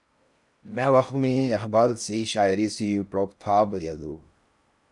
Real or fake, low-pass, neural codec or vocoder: fake; 10.8 kHz; codec, 16 kHz in and 24 kHz out, 0.6 kbps, FocalCodec, streaming, 4096 codes